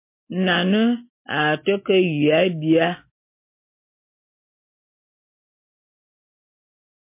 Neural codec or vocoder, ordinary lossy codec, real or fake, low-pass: none; MP3, 24 kbps; real; 3.6 kHz